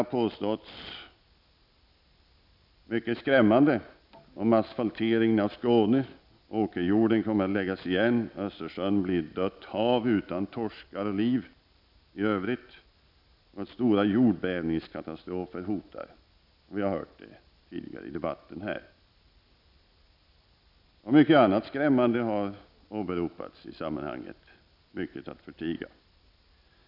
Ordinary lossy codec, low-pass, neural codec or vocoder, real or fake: none; 5.4 kHz; none; real